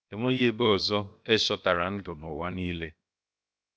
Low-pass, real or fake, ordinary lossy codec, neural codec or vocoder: none; fake; none; codec, 16 kHz, 0.7 kbps, FocalCodec